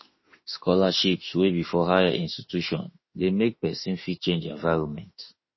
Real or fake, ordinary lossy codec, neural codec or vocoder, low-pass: fake; MP3, 24 kbps; autoencoder, 48 kHz, 32 numbers a frame, DAC-VAE, trained on Japanese speech; 7.2 kHz